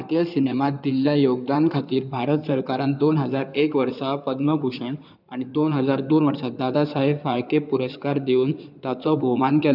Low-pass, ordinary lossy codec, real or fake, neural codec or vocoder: 5.4 kHz; none; fake; codec, 16 kHz in and 24 kHz out, 2.2 kbps, FireRedTTS-2 codec